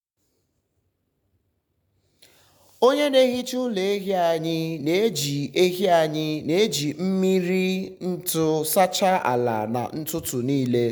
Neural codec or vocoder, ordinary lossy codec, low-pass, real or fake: none; none; none; real